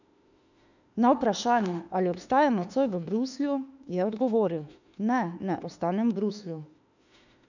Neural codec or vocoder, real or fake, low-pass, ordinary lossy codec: autoencoder, 48 kHz, 32 numbers a frame, DAC-VAE, trained on Japanese speech; fake; 7.2 kHz; none